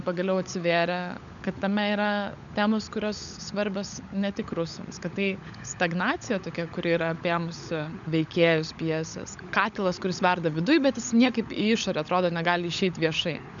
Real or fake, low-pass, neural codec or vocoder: fake; 7.2 kHz; codec, 16 kHz, 16 kbps, FunCodec, trained on LibriTTS, 50 frames a second